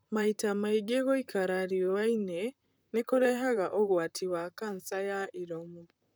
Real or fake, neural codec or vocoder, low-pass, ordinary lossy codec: fake; vocoder, 44.1 kHz, 128 mel bands, Pupu-Vocoder; none; none